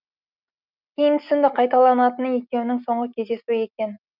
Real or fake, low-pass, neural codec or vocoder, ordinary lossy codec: real; 5.4 kHz; none; none